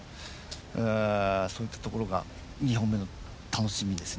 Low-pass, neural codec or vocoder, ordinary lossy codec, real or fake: none; none; none; real